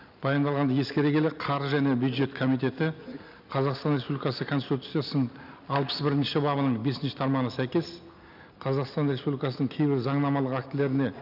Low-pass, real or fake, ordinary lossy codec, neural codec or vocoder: 5.4 kHz; real; none; none